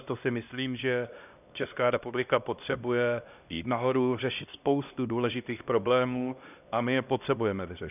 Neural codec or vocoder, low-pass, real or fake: codec, 16 kHz, 1 kbps, X-Codec, HuBERT features, trained on LibriSpeech; 3.6 kHz; fake